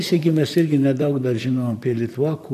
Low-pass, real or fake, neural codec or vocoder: 14.4 kHz; fake; vocoder, 44.1 kHz, 128 mel bands, Pupu-Vocoder